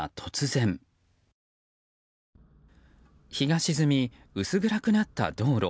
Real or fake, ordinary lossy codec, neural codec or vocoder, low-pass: real; none; none; none